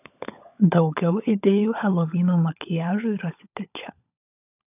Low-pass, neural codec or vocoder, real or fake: 3.6 kHz; codec, 16 kHz, 16 kbps, FunCodec, trained on LibriTTS, 50 frames a second; fake